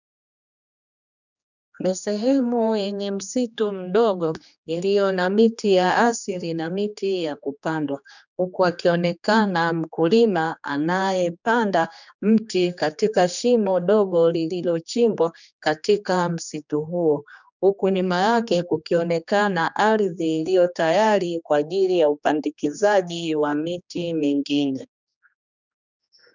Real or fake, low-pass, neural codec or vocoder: fake; 7.2 kHz; codec, 16 kHz, 2 kbps, X-Codec, HuBERT features, trained on general audio